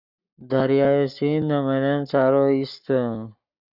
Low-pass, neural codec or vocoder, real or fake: 5.4 kHz; codec, 44.1 kHz, 7.8 kbps, Pupu-Codec; fake